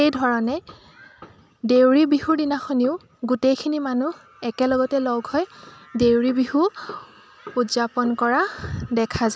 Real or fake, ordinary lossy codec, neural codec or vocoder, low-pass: real; none; none; none